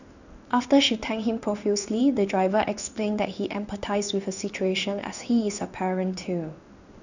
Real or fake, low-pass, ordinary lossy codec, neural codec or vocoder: fake; 7.2 kHz; none; codec, 16 kHz in and 24 kHz out, 1 kbps, XY-Tokenizer